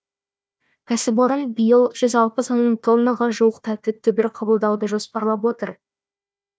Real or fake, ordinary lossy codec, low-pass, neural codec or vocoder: fake; none; none; codec, 16 kHz, 1 kbps, FunCodec, trained on Chinese and English, 50 frames a second